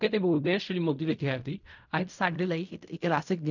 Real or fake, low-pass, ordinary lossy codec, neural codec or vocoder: fake; 7.2 kHz; none; codec, 16 kHz in and 24 kHz out, 0.4 kbps, LongCat-Audio-Codec, fine tuned four codebook decoder